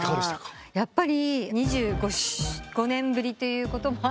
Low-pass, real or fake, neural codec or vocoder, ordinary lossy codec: none; real; none; none